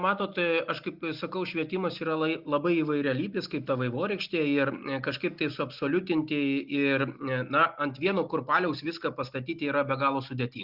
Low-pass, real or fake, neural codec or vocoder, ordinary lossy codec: 5.4 kHz; real; none; Opus, 64 kbps